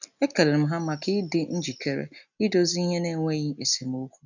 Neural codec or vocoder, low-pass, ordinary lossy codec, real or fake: none; 7.2 kHz; none; real